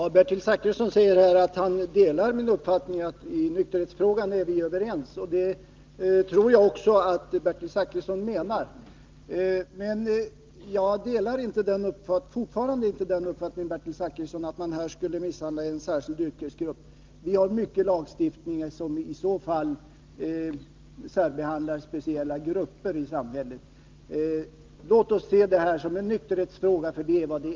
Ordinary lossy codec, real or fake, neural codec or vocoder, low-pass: Opus, 32 kbps; real; none; 7.2 kHz